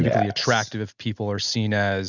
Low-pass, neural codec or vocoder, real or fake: 7.2 kHz; none; real